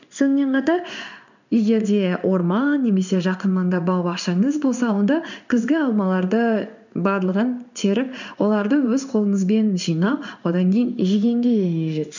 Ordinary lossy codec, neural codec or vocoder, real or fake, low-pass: none; codec, 16 kHz in and 24 kHz out, 1 kbps, XY-Tokenizer; fake; 7.2 kHz